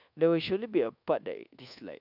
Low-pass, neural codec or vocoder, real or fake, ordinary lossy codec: 5.4 kHz; codec, 24 kHz, 1.2 kbps, DualCodec; fake; none